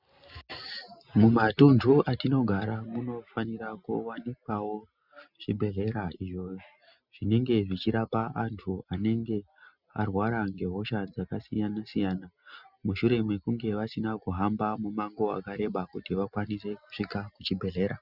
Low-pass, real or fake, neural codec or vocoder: 5.4 kHz; real; none